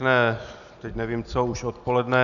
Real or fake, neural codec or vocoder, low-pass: real; none; 7.2 kHz